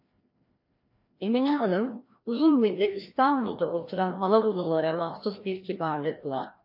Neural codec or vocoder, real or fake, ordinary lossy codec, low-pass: codec, 16 kHz, 1 kbps, FreqCodec, larger model; fake; MP3, 32 kbps; 5.4 kHz